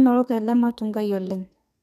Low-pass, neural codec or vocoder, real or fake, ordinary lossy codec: 14.4 kHz; codec, 32 kHz, 1.9 kbps, SNAC; fake; none